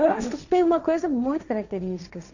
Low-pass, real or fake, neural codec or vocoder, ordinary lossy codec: 7.2 kHz; fake; codec, 16 kHz, 1.1 kbps, Voila-Tokenizer; none